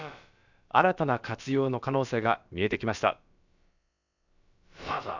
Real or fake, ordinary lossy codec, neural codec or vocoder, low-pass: fake; none; codec, 16 kHz, about 1 kbps, DyCAST, with the encoder's durations; 7.2 kHz